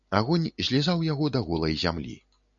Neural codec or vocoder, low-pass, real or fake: none; 7.2 kHz; real